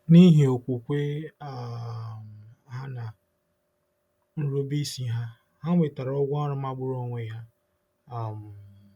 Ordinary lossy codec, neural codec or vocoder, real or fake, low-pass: none; none; real; 19.8 kHz